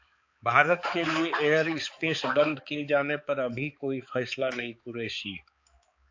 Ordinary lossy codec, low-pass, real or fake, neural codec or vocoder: AAC, 48 kbps; 7.2 kHz; fake; codec, 16 kHz, 4 kbps, X-Codec, HuBERT features, trained on balanced general audio